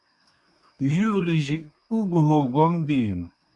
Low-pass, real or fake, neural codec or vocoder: 10.8 kHz; fake; codec, 24 kHz, 1 kbps, SNAC